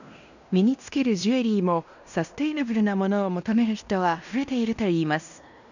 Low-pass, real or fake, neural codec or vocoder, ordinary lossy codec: 7.2 kHz; fake; codec, 16 kHz in and 24 kHz out, 0.9 kbps, LongCat-Audio-Codec, fine tuned four codebook decoder; none